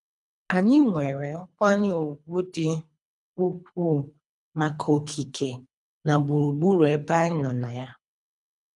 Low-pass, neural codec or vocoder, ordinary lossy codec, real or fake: 10.8 kHz; codec, 24 kHz, 3 kbps, HILCodec; none; fake